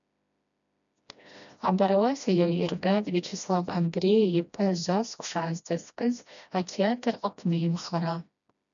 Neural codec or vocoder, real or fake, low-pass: codec, 16 kHz, 1 kbps, FreqCodec, smaller model; fake; 7.2 kHz